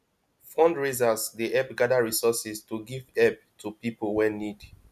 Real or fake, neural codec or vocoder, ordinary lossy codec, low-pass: real; none; none; 14.4 kHz